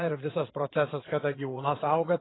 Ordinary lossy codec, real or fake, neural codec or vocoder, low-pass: AAC, 16 kbps; fake; vocoder, 22.05 kHz, 80 mel bands, Vocos; 7.2 kHz